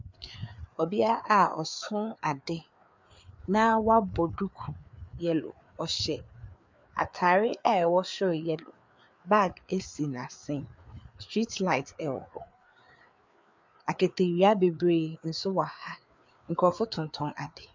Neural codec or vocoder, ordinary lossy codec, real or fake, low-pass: codec, 16 kHz, 4 kbps, FreqCodec, larger model; MP3, 64 kbps; fake; 7.2 kHz